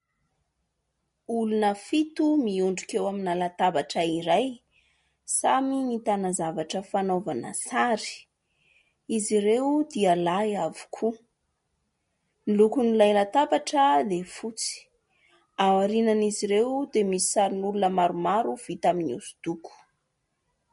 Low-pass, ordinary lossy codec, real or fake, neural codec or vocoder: 10.8 kHz; MP3, 48 kbps; real; none